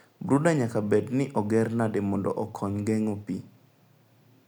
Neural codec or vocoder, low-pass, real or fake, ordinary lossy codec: none; none; real; none